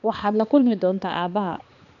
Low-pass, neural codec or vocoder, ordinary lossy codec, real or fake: 7.2 kHz; codec, 16 kHz, 2 kbps, X-Codec, HuBERT features, trained on balanced general audio; none; fake